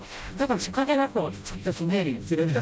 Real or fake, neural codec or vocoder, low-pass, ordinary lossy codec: fake; codec, 16 kHz, 0.5 kbps, FreqCodec, smaller model; none; none